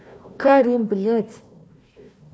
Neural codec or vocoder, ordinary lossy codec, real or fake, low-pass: codec, 16 kHz, 1 kbps, FunCodec, trained on Chinese and English, 50 frames a second; none; fake; none